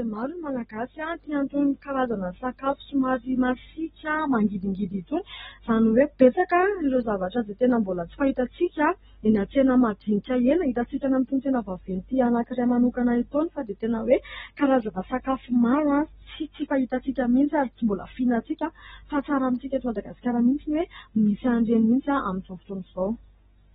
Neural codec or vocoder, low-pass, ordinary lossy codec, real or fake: none; 19.8 kHz; AAC, 16 kbps; real